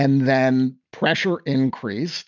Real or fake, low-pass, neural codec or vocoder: real; 7.2 kHz; none